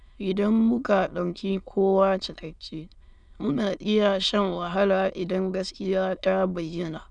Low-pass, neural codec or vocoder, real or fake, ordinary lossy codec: 9.9 kHz; autoencoder, 22.05 kHz, a latent of 192 numbers a frame, VITS, trained on many speakers; fake; none